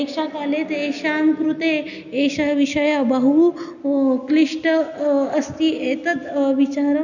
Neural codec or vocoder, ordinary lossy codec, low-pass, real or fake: none; none; 7.2 kHz; real